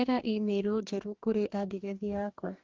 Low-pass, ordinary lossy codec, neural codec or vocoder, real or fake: 7.2 kHz; Opus, 24 kbps; codec, 44.1 kHz, 2.6 kbps, DAC; fake